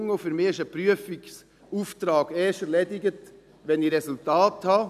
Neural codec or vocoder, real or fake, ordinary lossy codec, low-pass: none; real; none; 14.4 kHz